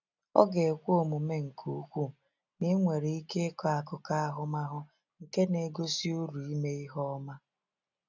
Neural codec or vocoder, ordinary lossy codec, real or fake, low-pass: none; none; real; 7.2 kHz